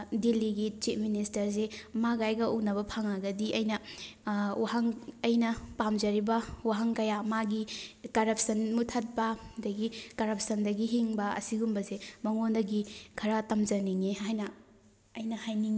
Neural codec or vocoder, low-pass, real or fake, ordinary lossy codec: none; none; real; none